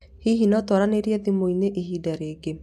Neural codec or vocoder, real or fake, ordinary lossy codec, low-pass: none; real; none; 14.4 kHz